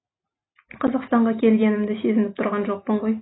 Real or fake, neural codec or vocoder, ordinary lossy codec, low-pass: real; none; AAC, 16 kbps; 7.2 kHz